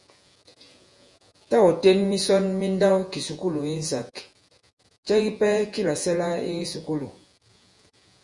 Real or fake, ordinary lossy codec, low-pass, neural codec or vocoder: fake; Opus, 64 kbps; 10.8 kHz; vocoder, 48 kHz, 128 mel bands, Vocos